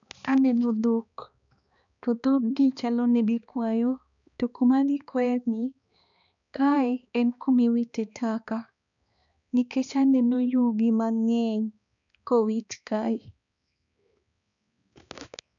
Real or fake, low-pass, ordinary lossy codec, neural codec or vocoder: fake; 7.2 kHz; none; codec, 16 kHz, 2 kbps, X-Codec, HuBERT features, trained on balanced general audio